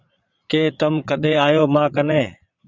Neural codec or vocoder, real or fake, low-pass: vocoder, 22.05 kHz, 80 mel bands, Vocos; fake; 7.2 kHz